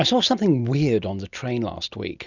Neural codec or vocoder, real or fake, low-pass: none; real; 7.2 kHz